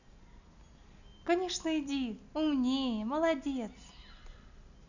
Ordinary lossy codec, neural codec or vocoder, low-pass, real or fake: none; none; 7.2 kHz; real